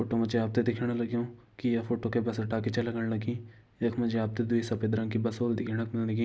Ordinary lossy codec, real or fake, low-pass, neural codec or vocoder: none; real; none; none